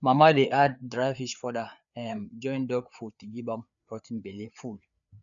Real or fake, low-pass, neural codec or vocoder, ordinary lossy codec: fake; 7.2 kHz; codec, 16 kHz, 4 kbps, FreqCodec, larger model; none